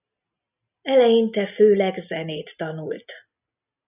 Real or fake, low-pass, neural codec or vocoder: real; 3.6 kHz; none